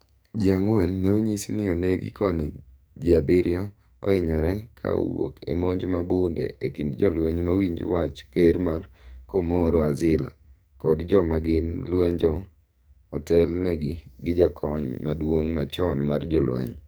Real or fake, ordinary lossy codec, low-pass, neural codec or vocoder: fake; none; none; codec, 44.1 kHz, 2.6 kbps, SNAC